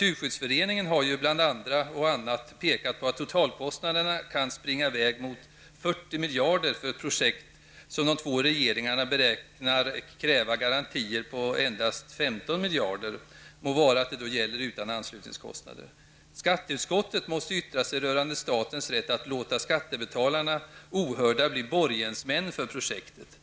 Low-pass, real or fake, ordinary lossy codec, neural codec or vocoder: none; real; none; none